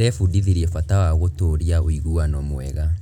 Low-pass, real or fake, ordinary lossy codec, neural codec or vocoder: 19.8 kHz; real; none; none